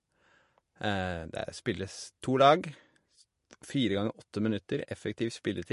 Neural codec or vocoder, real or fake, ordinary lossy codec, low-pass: none; real; MP3, 48 kbps; 14.4 kHz